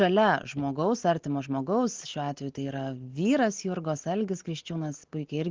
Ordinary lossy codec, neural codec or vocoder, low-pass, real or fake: Opus, 16 kbps; none; 7.2 kHz; real